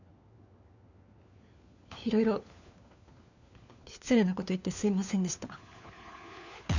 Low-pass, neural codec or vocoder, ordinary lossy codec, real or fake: 7.2 kHz; codec, 16 kHz, 4 kbps, FunCodec, trained on LibriTTS, 50 frames a second; none; fake